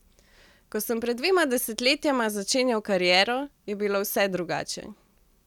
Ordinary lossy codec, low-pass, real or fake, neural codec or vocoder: none; 19.8 kHz; real; none